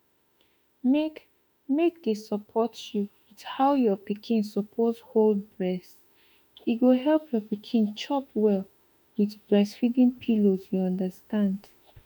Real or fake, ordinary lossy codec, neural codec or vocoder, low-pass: fake; none; autoencoder, 48 kHz, 32 numbers a frame, DAC-VAE, trained on Japanese speech; 19.8 kHz